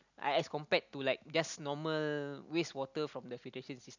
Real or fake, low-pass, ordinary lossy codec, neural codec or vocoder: real; 7.2 kHz; none; none